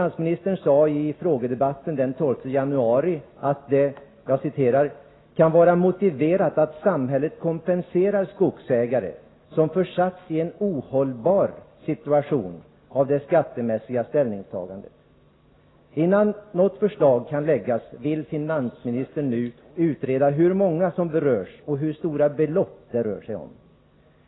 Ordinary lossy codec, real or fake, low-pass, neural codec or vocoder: AAC, 16 kbps; real; 7.2 kHz; none